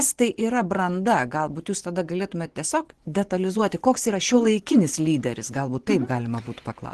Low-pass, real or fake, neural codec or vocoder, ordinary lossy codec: 10.8 kHz; fake; vocoder, 24 kHz, 100 mel bands, Vocos; Opus, 16 kbps